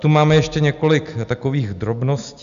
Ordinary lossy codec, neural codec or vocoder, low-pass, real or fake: AAC, 96 kbps; none; 7.2 kHz; real